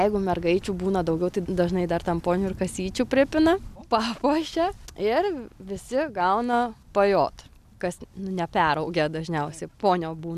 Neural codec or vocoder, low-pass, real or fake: none; 14.4 kHz; real